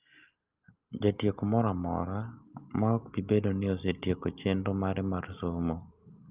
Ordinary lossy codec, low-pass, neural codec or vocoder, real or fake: Opus, 24 kbps; 3.6 kHz; none; real